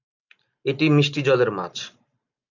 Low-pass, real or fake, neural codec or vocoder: 7.2 kHz; real; none